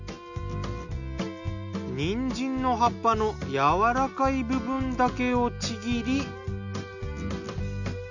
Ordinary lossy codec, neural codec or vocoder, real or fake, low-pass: none; none; real; 7.2 kHz